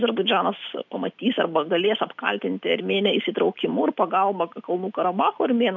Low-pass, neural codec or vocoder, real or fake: 7.2 kHz; none; real